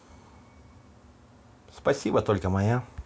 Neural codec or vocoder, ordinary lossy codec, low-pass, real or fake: none; none; none; real